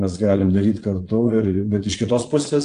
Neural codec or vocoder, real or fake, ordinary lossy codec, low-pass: vocoder, 22.05 kHz, 80 mel bands, Vocos; fake; AAC, 48 kbps; 9.9 kHz